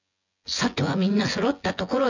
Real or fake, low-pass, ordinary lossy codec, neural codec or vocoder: fake; 7.2 kHz; none; vocoder, 24 kHz, 100 mel bands, Vocos